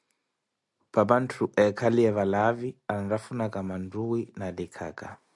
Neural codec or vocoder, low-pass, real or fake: none; 10.8 kHz; real